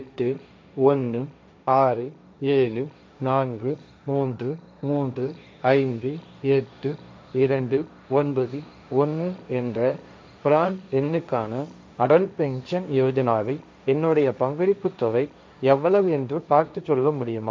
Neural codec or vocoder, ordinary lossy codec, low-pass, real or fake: codec, 16 kHz, 1.1 kbps, Voila-Tokenizer; none; none; fake